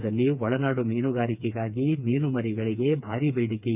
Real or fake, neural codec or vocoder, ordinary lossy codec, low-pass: fake; codec, 16 kHz, 4 kbps, FreqCodec, smaller model; none; 3.6 kHz